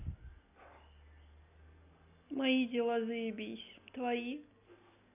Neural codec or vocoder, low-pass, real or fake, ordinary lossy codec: none; 3.6 kHz; real; none